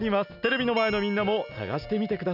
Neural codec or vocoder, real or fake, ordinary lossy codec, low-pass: none; real; none; 5.4 kHz